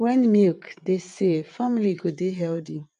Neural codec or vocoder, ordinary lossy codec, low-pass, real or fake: vocoder, 22.05 kHz, 80 mel bands, WaveNeXt; none; 9.9 kHz; fake